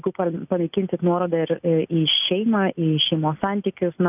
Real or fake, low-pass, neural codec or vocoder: real; 3.6 kHz; none